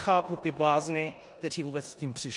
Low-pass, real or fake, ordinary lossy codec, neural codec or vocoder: 10.8 kHz; fake; MP3, 64 kbps; codec, 16 kHz in and 24 kHz out, 0.9 kbps, LongCat-Audio-Codec, four codebook decoder